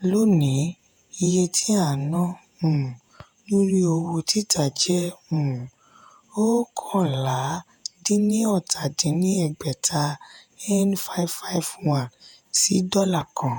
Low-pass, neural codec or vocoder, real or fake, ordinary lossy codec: none; vocoder, 48 kHz, 128 mel bands, Vocos; fake; none